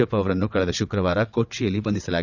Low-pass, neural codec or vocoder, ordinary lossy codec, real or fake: 7.2 kHz; vocoder, 22.05 kHz, 80 mel bands, WaveNeXt; none; fake